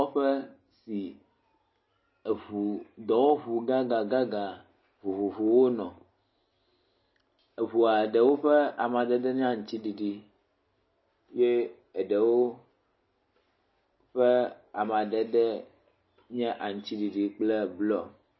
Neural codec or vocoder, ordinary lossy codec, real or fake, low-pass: none; MP3, 24 kbps; real; 7.2 kHz